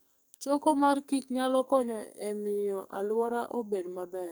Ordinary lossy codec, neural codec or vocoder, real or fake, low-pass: none; codec, 44.1 kHz, 2.6 kbps, SNAC; fake; none